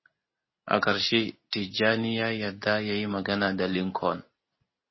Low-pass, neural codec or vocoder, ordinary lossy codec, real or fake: 7.2 kHz; none; MP3, 24 kbps; real